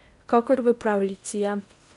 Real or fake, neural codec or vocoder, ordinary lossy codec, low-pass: fake; codec, 16 kHz in and 24 kHz out, 0.8 kbps, FocalCodec, streaming, 65536 codes; none; 10.8 kHz